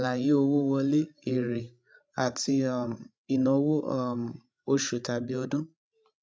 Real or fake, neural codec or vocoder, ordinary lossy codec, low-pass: fake; codec, 16 kHz, 16 kbps, FreqCodec, larger model; none; none